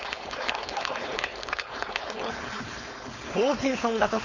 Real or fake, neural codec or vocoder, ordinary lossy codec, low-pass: fake; codec, 16 kHz, 4.8 kbps, FACodec; Opus, 64 kbps; 7.2 kHz